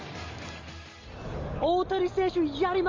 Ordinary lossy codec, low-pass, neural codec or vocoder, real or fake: Opus, 32 kbps; 7.2 kHz; none; real